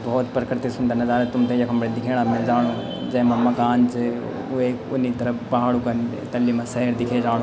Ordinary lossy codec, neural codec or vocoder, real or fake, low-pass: none; none; real; none